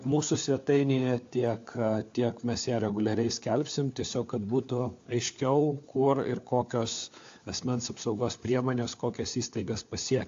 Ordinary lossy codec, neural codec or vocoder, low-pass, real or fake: AAC, 48 kbps; codec, 16 kHz, 4 kbps, FunCodec, trained on LibriTTS, 50 frames a second; 7.2 kHz; fake